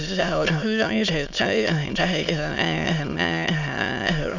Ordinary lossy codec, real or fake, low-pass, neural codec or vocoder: none; fake; 7.2 kHz; autoencoder, 22.05 kHz, a latent of 192 numbers a frame, VITS, trained on many speakers